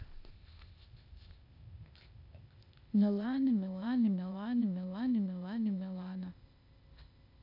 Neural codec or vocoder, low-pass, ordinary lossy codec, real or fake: codec, 16 kHz, 0.8 kbps, ZipCodec; 5.4 kHz; none; fake